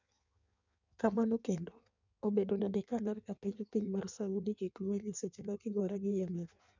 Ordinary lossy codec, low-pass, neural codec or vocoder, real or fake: none; 7.2 kHz; codec, 16 kHz in and 24 kHz out, 1.1 kbps, FireRedTTS-2 codec; fake